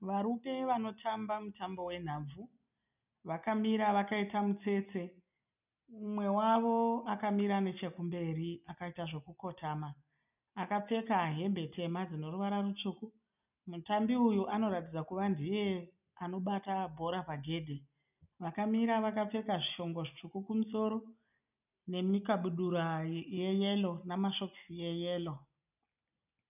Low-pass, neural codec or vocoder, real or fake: 3.6 kHz; none; real